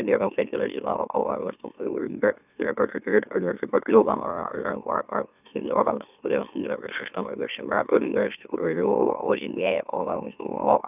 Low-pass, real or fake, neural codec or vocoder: 3.6 kHz; fake; autoencoder, 44.1 kHz, a latent of 192 numbers a frame, MeloTTS